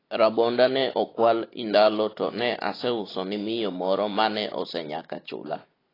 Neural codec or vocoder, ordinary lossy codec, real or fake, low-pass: none; AAC, 24 kbps; real; 5.4 kHz